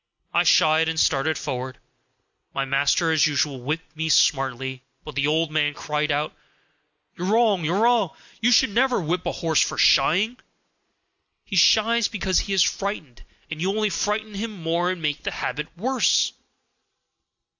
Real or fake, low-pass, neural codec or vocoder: real; 7.2 kHz; none